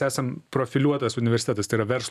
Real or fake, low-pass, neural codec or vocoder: real; 14.4 kHz; none